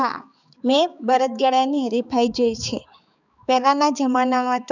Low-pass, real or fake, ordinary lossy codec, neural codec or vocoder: 7.2 kHz; fake; none; codec, 16 kHz, 4 kbps, X-Codec, HuBERT features, trained on balanced general audio